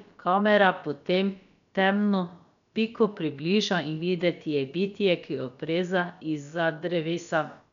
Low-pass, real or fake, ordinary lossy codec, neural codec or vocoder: 7.2 kHz; fake; none; codec, 16 kHz, about 1 kbps, DyCAST, with the encoder's durations